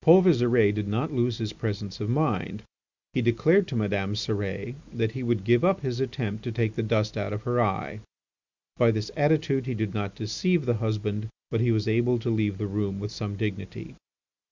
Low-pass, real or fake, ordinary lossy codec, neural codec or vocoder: 7.2 kHz; real; Opus, 64 kbps; none